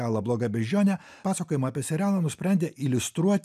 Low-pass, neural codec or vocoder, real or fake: 14.4 kHz; none; real